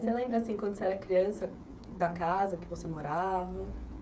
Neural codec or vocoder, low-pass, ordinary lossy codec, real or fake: codec, 16 kHz, 8 kbps, FreqCodec, smaller model; none; none; fake